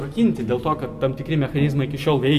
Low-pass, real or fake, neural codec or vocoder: 14.4 kHz; real; none